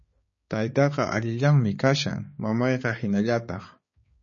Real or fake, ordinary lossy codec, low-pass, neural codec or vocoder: fake; MP3, 32 kbps; 7.2 kHz; codec, 16 kHz, 4 kbps, X-Codec, HuBERT features, trained on balanced general audio